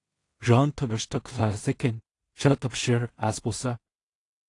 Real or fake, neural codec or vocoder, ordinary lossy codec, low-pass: fake; codec, 16 kHz in and 24 kHz out, 0.4 kbps, LongCat-Audio-Codec, two codebook decoder; AAC, 48 kbps; 10.8 kHz